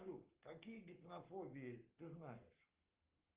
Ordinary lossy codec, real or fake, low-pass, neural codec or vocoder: Opus, 32 kbps; fake; 3.6 kHz; codec, 44.1 kHz, 7.8 kbps, Pupu-Codec